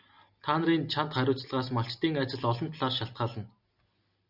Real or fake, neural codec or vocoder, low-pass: real; none; 5.4 kHz